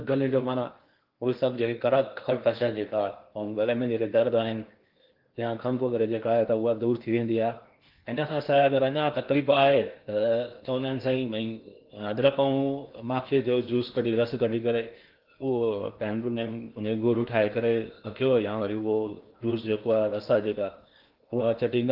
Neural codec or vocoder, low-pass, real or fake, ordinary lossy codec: codec, 16 kHz in and 24 kHz out, 0.8 kbps, FocalCodec, streaming, 65536 codes; 5.4 kHz; fake; Opus, 32 kbps